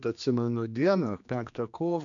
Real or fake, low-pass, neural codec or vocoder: fake; 7.2 kHz; codec, 16 kHz, 2 kbps, X-Codec, HuBERT features, trained on general audio